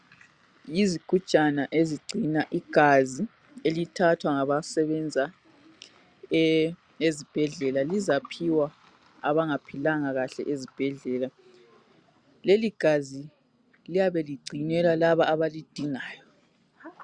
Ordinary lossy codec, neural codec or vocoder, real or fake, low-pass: Opus, 64 kbps; none; real; 9.9 kHz